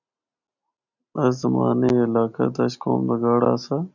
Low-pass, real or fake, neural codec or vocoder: 7.2 kHz; real; none